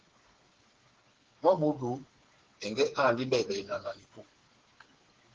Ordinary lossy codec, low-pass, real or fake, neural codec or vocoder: Opus, 16 kbps; 7.2 kHz; fake; codec, 16 kHz, 4 kbps, FreqCodec, smaller model